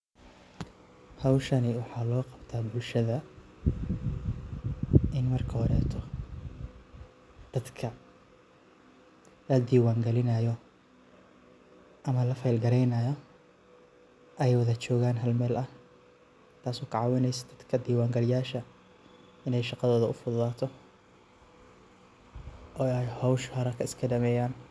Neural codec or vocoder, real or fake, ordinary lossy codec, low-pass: none; real; none; none